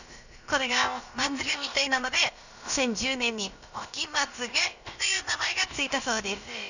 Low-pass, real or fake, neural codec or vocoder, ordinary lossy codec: 7.2 kHz; fake; codec, 16 kHz, about 1 kbps, DyCAST, with the encoder's durations; none